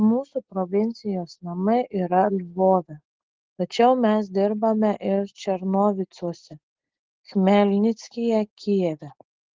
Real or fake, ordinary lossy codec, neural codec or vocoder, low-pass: real; Opus, 16 kbps; none; 7.2 kHz